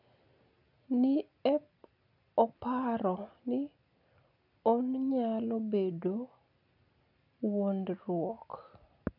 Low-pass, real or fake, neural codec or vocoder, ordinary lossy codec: 5.4 kHz; real; none; none